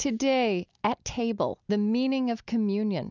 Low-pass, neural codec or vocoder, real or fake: 7.2 kHz; none; real